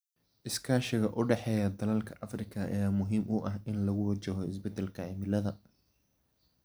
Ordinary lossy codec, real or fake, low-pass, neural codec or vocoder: none; real; none; none